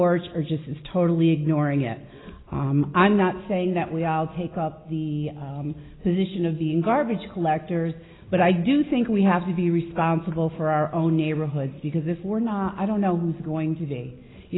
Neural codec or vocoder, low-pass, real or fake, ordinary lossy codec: none; 7.2 kHz; real; AAC, 16 kbps